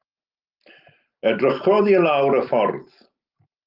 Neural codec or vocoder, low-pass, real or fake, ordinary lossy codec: none; 5.4 kHz; real; Opus, 24 kbps